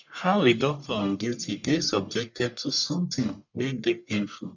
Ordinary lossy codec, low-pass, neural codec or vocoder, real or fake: none; 7.2 kHz; codec, 44.1 kHz, 1.7 kbps, Pupu-Codec; fake